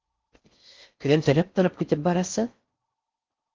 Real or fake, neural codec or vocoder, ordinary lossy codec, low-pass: fake; codec, 16 kHz in and 24 kHz out, 0.6 kbps, FocalCodec, streaming, 4096 codes; Opus, 32 kbps; 7.2 kHz